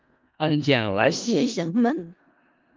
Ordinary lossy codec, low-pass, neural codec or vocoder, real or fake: Opus, 32 kbps; 7.2 kHz; codec, 16 kHz in and 24 kHz out, 0.4 kbps, LongCat-Audio-Codec, four codebook decoder; fake